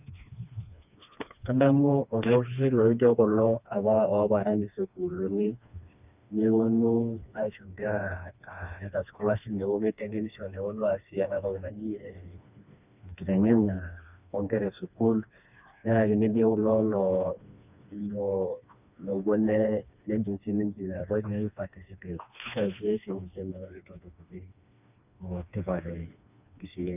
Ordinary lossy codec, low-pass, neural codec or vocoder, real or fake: none; 3.6 kHz; codec, 16 kHz, 2 kbps, FreqCodec, smaller model; fake